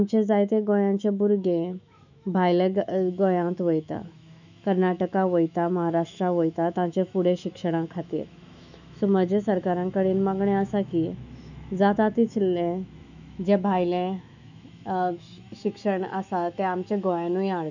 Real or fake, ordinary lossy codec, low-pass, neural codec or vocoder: fake; none; 7.2 kHz; autoencoder, 48 kHz, 128 numbers a frame, DAC-VAE, trained on Japanese speech